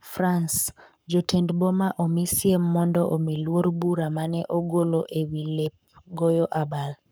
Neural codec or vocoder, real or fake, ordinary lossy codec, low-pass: codec, 44.1 kHz, 7.8 kbps, Pupu-Codec; fake; none; none